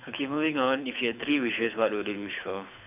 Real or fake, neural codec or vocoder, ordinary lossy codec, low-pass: fake; codec, 24 kHz, 6 kbps, HILCodec; none; 3.6 kHz